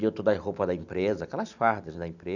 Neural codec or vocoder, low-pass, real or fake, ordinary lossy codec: none; 7.2 kHz; real; none